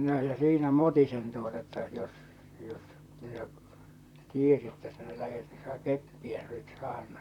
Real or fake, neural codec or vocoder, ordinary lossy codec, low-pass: fake; vocoder, 44.1 kHz, 128 mel bands, Pupu-Vocoder; none; 19.8 kHz